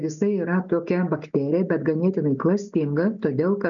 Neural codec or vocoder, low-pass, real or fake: none; 7.2 kHz; real